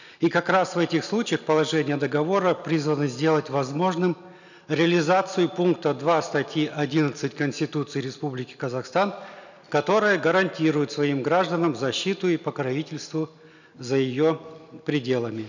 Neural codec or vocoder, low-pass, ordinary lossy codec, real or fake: none; 7.2 kHz; none; real